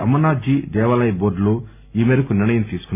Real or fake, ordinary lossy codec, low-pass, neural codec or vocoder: real; none; 3.6 kHz; none